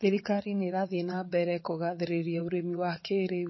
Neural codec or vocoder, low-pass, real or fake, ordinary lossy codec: vocoder, 22.05 kHz, 80 mel bands, WaveNeXt; 7.2 kHz; fake; MP3, 24 kbps